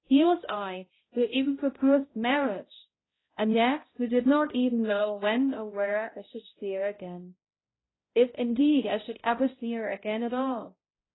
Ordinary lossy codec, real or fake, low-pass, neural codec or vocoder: AAC, 16 kbps; fake; 7.2 kHz; codec, 16 kHz, 0.5 kbps, X-Codec, HuBERT features, trained on balanced general audio